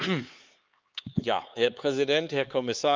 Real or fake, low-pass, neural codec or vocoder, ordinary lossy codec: fake; 7.2 kHz; codec, 16 kHz, 4 kbps, X-Codec, HuBERT features, trained on LibriSpeech; Opus, 32 kbps